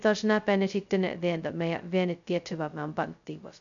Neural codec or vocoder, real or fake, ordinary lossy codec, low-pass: codec, 16 kHz, 0.2 kbps, FocalCodec; fake; none; 7.2 kHz